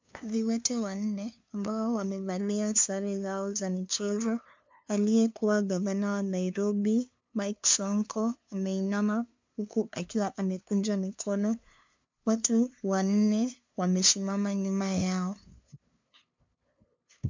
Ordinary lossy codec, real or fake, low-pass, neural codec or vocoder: MP3, 64 kbps; fake; 7.2 kHz; codec, 16 kHz, 2 kbps, FunCodec, trained on LibriTTS, 25 frames a second